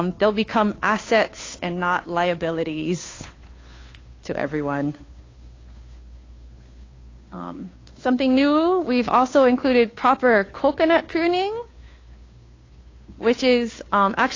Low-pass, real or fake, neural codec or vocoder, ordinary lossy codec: 7.2 kHz; fake; codec, 16 kHz, 2 kbps, FunCodec, trained on Chinese and English, 25 frames a second; AAC, 32 kbps